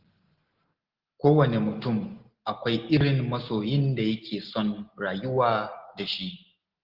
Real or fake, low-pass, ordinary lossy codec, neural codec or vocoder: real; 5.4 kHz; Opus, 16 kbps; none